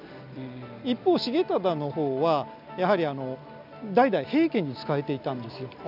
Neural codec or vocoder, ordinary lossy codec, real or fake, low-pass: none; none; real; 5.4 kHz